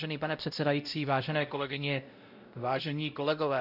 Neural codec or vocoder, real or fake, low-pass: codec, 16 kHz, 0.5 kbps, X-Codec, WavLM features, trained on Multilingual LibriSpeech; fake; 5.4 kHz